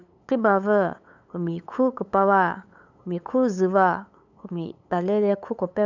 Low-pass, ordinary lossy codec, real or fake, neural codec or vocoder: 7.2 kHz; none; fake; codec, 16 kHz, 8 kbps, FunCodec, trained on LibriTTS, 25 frames a second